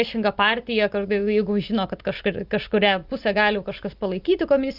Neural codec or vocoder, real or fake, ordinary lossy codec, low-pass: none; real; Opus, 32 kbps; 5.4 kHz